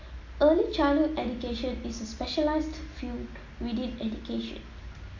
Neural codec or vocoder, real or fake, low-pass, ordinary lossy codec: none; real; 7.2 kHz; AAC, 48 kbps